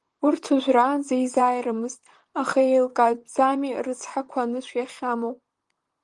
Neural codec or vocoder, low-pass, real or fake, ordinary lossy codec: vocoder, 44.1 kHz, 128 mel bands, Pupu-Vocoder; 10.8 kHz; fake; Opus, 24 kbps